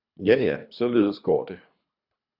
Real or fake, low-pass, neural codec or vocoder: fake; 5.4 kHz; codec, 24 kHz, 3 kbps, HILCodec